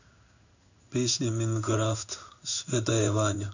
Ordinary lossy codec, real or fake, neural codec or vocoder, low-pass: none; fake; codec, 16 kHz in and 24 kHz out, 1 kbps, XY-Tokenizer; 7.2 kHz